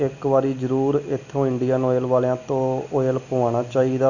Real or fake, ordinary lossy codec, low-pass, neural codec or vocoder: real; none; 7.2 kHz; none